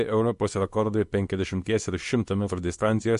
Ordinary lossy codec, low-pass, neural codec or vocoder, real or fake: MP3, 48 kbps; 10.8 kHz; codec, 24 kHz, 0.9 kbps, WavTokenizer, small release; fake